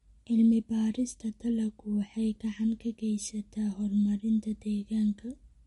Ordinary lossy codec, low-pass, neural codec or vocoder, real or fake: MP3, 48 kbps; 19.8 kHz; none; real